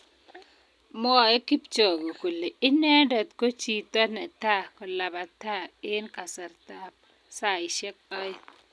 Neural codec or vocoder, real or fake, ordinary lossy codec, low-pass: none; real; none; none